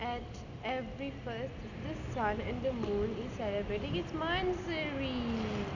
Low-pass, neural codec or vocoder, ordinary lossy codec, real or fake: 7.2 kHz; none; none; real